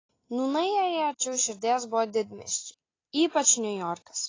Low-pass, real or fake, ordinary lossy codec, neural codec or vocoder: 7.2 kHz; real; AAC, 32 kbps; none